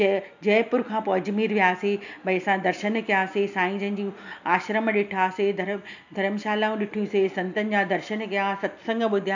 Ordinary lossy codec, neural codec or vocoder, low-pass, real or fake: none; none; 7.2 kHz; real